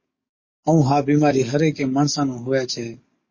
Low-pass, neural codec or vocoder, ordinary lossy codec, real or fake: 7.2 kHz; codec, 44.1 kHz, 7.8 kbps, DAC; MP3, 32 kbps; fake